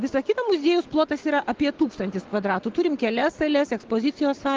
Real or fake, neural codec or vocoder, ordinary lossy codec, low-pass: real; none; Opus, 16 kbps; 7.2 kHz